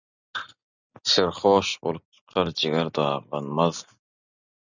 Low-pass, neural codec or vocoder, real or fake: 7.2 kHz; none; real